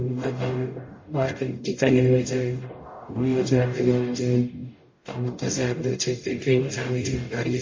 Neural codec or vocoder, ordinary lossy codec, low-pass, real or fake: codec, 44.1 kHz, 0.9 kbps, DAC; MP3, 32 kbps; 7.2 kHz; fake